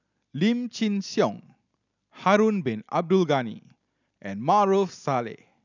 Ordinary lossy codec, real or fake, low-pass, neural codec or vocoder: none; real; 7.2 kHz; none